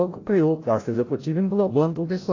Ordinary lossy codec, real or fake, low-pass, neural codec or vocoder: AAC, 32 kbps; fake; 7.2 kHz; codec, 16 kHz, 0.5 kbps, FreqCodec, larger model